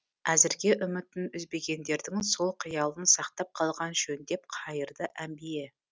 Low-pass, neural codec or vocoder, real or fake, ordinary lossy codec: none; none; real; none